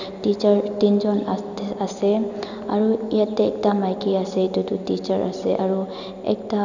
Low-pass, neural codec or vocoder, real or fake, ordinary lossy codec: 7.2 kHz; none; real; none